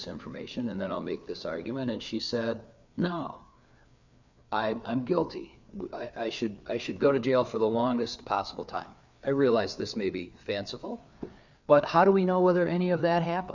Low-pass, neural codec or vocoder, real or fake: 7.2 kHz; codec, 16 kHz, 4 kbps, FreqCodec, larger model; fake